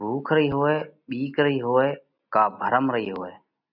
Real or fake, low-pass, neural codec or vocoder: real; 5.4 kHz; none